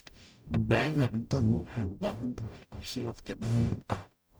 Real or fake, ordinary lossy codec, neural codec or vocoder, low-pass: fake; none; codec, 44.1 kHz, 0.9 kbps, DAC; none